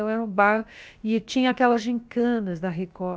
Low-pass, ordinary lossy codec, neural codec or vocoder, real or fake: none; none; codec, 16 kHz, about 1 kbps, DyCAST, with the encoder's durations; fake